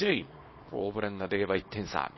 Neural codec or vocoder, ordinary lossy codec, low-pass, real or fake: codec, 24 kHz, 0.9 kbps, WavTokenizer, small release; MP3, 24 kbps; 7.2 kHz; fake